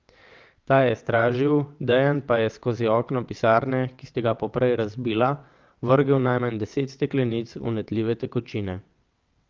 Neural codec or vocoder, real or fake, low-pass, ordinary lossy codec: vocoder, 22.05 kHz, 80 mel bands, WaveNeXt; fake; 7.2 kHz; Opus, 24 kbps